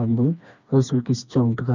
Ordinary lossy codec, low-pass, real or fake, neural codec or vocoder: none; 7.2 kHz; fake; codec, 16 kHz, 2 kbps, FreqCodec, smaller model